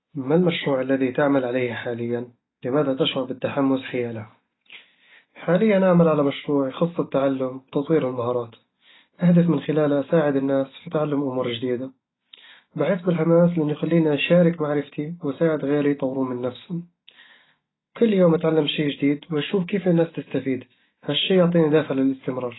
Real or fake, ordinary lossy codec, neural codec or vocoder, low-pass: real; AAC, 16 kbps; none; 7.2 kHz